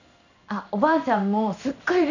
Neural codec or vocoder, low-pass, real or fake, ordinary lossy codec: codec, 16 kHz in and 24 kHz out, 1 kbps, XY-Tokenizer; 7.2 kHz; fake; none